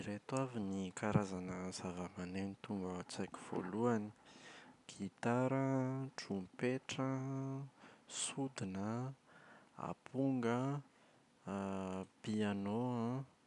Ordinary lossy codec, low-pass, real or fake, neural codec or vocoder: none; 10.8 kHz; real; none